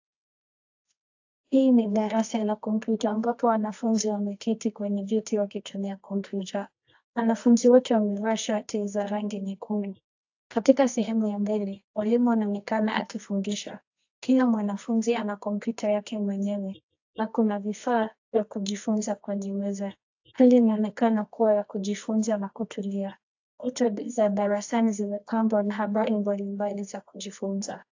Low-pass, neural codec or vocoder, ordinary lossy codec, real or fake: 7.2 kHz; codec, 24 kHz, 0.9 kbps, WavTokenizer, medium music audio release; AAC, 48 kbps; fake